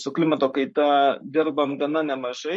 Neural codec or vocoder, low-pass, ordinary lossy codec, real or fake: codec, 44.1 kHz, 7.8 kbps, Pupu-Codec; 10.8 kHz; MP3, 48 kbps; fake